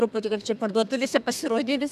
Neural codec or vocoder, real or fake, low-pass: codec, 32 kHz, 1.9 kbps, SNAC; fake; 14.4 kHz